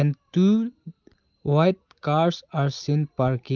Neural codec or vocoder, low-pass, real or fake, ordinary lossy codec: none; 7.2 kHz; real; Opus, 24 kbps